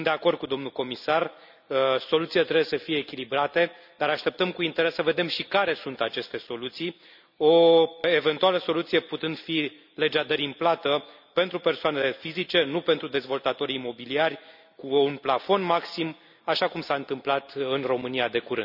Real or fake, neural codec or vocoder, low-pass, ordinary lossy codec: real; none; 5.4 kHz; none